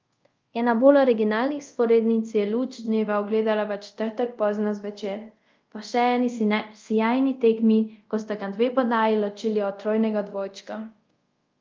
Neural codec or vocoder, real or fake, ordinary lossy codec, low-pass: codec, 24 kHz, 0.5 kbps, DualCodec; fake; Opus, 24 kbps; 7.2 kHz